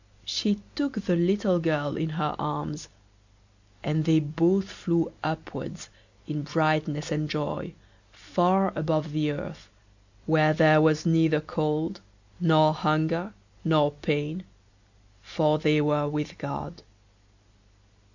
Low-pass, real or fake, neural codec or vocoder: 7.2 kHz; real; none